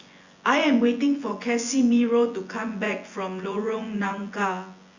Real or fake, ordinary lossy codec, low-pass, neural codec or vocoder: fake; Opus, 64 kbps; 7.2 kHz; vocoder, 24 kHz, 100 mel bands, Vocos